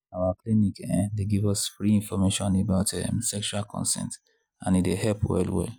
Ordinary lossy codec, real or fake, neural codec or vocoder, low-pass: none; real; none; none